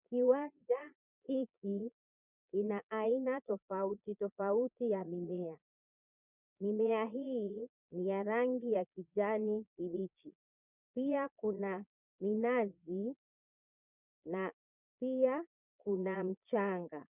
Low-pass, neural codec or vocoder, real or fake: 3.6 kHz; vocoder, 22.05 kHz, 80 mel bands, Vocos; fake